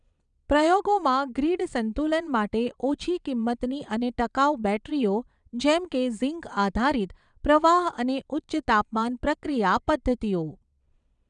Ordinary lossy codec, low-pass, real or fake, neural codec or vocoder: none; 9.9 kHz; fake; vocoder, 22.05 kHz, 80 mel bands, Vocos